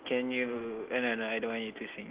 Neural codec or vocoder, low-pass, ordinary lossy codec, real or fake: none; 3.6 kHz; Opus, 16 kbps; real